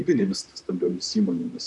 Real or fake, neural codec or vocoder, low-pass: fake; vocoder, 44.1 kHz, 128 mel bands, Pupu-Vocoder; 10.8 kHz